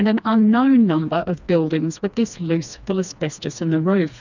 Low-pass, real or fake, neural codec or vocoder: 7.2 kHz; fake; codec, 16 kHz, 2 kbps, FreqCodec, smaller model